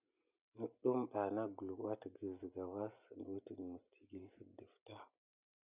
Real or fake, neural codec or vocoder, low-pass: real; none; 3.6 kHz